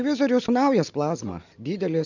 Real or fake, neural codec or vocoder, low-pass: real; none; 7.2 kHz